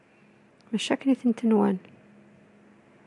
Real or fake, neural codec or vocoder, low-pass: real; none; 10.8 kHz